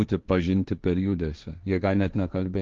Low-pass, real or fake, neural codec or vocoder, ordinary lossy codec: 7.2 kHz; fake; codec, 16 kHz, 1.1 kbps, Voila-Tokenizer; Opus, 32 kbps